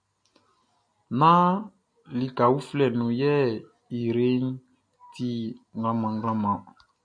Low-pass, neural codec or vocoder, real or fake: 9.9 kHz; none; real